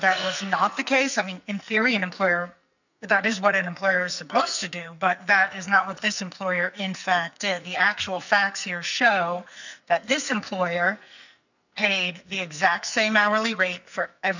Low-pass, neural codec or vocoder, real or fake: 7.2 kHz; codec, 44.1 kHz, 2.6 kbps, SNAC; fake